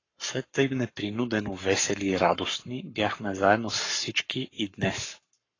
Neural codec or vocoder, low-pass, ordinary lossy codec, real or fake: codec, 44.1 kHz, 7.8 kbps, Pupu-Codec; 7.2 kHz; AAC, 32 kbps; fake